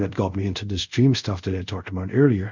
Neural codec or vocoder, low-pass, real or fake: codec, 24 kHz, 0.5 kbps, DualCodec; 7.2 kHz; fake